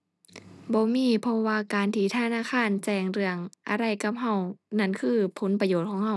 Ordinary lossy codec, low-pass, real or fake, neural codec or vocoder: none; none; real; none